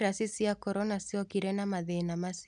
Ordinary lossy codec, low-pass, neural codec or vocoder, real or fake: none; 10.8 kHz; none; real